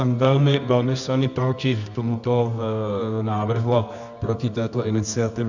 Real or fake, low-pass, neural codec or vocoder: fake; 7.2 kHz; codec, 24 kHz, 0.9 kbps, WavTokenizer, medium music audio release